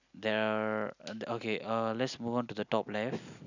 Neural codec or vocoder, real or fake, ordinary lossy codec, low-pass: none; real; none; 7.2 kHz